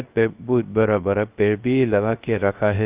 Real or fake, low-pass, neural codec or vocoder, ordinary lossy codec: fake; 3.6 kHz; codec, 16 kHz, 0.3 kbps, FocalCodec; Opus, 24 kbps